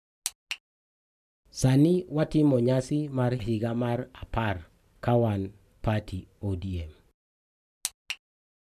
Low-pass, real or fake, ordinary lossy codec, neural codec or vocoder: 14.4 kHz; real; none; none